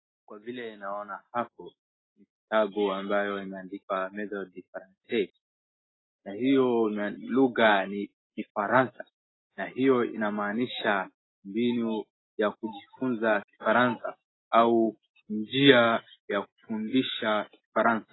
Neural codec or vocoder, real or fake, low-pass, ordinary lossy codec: none; real; 7.2 kHz; AAC, 16 kbps